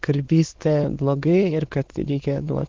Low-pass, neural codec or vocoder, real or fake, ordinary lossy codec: 7.2 kHz; autoencoder, 22.05 kHz, a latent of 192 numbers a frame, VITS, trained on many speakers; fake; Opus, 16 kbps